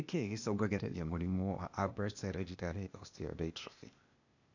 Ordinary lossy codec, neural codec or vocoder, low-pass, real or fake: none; codec, 16 kHz, 0.8 kbps, ZipCodec; 7.2 kHz; fake